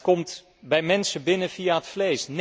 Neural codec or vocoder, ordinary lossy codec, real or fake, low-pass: none; none; real; none